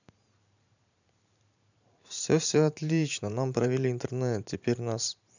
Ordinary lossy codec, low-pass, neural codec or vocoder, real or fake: none; 7.2 kHz; vocoder, 44.1 kHz, 128 mel bands every 512 samples, BigVGAN v2; fake